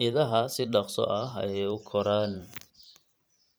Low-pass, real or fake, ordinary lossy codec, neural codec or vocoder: none; fake; none; vocoder, 44.1 kHz, 128 mel bands every 512 samples, BigVGAN v2